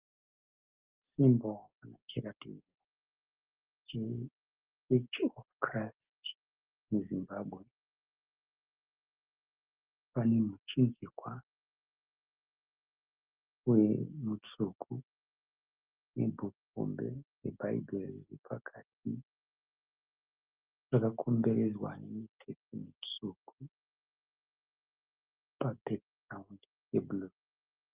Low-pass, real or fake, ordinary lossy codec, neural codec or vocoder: 3.6 kHz; real; Opus, 24 kbps; none